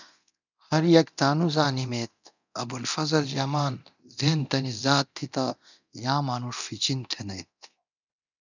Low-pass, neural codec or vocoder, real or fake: 7.2 kHz; codec, 24 kHz, 0.9 kbps, DualCodec; fake